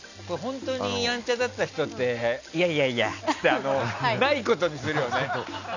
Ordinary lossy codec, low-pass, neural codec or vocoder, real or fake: none; 7.2 kHz; none; real